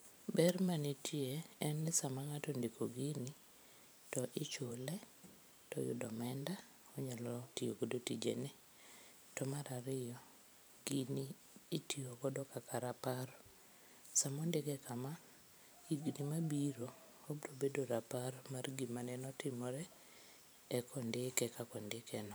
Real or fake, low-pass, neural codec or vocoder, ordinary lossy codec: fake; none; vocoder, 44.1 kHz, 128 mel bands every 512 samples, BigVGAN v2; none